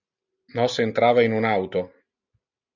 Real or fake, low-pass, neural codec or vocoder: real; 7.2 kHz; none